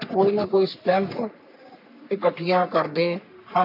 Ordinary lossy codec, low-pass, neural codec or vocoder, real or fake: none; 5.4 kHz; codec, 44.1 kHz, 3.4 kbps, Pupu-Codec; fake